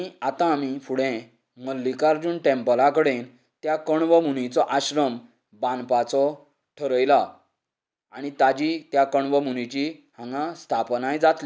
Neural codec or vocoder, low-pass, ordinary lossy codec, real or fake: none; none; none; real